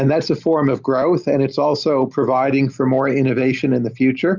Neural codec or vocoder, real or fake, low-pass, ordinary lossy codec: none; real; 7.2 kHz; Opus, 64 kbps